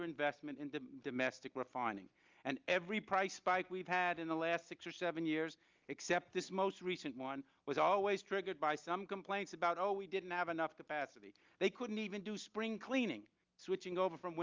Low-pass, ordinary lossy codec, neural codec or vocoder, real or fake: 7.2 kHz; Opus, 24 kbps; none; real